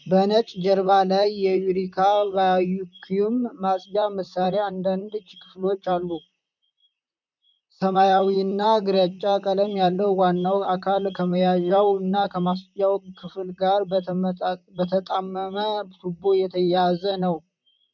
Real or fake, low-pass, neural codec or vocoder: fake; 7.2 kHz; vocoder, 44.1 kHz, 128 mel bands, Pupu-Vocoder